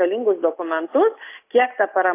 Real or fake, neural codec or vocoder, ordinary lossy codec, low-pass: real; none; AAC, 24 kbps; 3.6 kHz